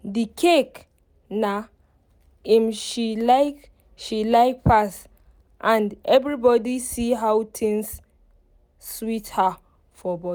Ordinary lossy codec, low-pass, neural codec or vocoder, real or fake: none; none; none; real